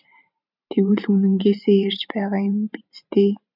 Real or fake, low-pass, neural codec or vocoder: fake; 5.4 kHz; vocoder, 44.1 kHz, 128 mel bands every 512 samples, BigVGAN v2